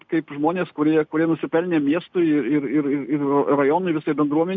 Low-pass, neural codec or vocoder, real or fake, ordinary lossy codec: 7.2 kHz; none; real; MP3, 48 kbps